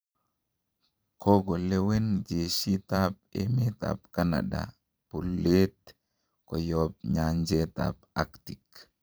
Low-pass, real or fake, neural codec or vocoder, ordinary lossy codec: none; fake; vocoder, 44.1 kHz, 128 mel bands every 512 samples, BigVGAN v2; none